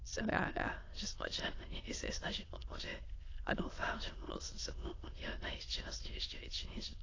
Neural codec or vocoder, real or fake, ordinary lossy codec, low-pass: autoencoder, 22.05 kHz, a latent of 192 numbers a frame, VITS, trained on many speakers; fake; AAC, 32 kbps; 7.2 kHz